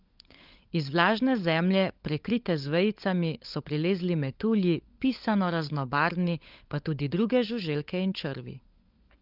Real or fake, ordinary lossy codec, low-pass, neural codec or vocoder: fake; Opus, 32 kbps; 5.4 kHz; codec, 16 kHz, 16 kbps, FunCodec, trained on LibriTTS, 50 frames a second